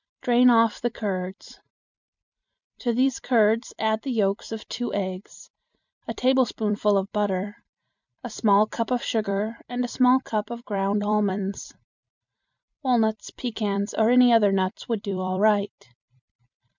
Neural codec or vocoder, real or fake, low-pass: vocoder, 44.1 kHz, 128 mel bands every 256 samples, BigVGAN v2; fake; 7.2 kHz